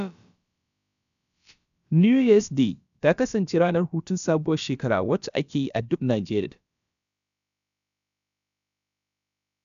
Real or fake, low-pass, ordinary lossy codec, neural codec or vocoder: fake; 7.2 kHz; none; codec, 16 kHz, about 1 kbps, DyCAST, with the encoder's durations